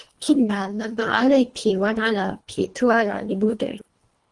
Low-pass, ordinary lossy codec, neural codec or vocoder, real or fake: 10.8 kHz; Opus, 24 kbps; codec, 24 kHz, 1.5 kbps, HILCodec; fake